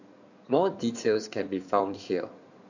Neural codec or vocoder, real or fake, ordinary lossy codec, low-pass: codec, 16 kHz in and 24 kHz out, 2.2 kbps, FireRedTTS-2 codec; fake; none; 7.2 kHz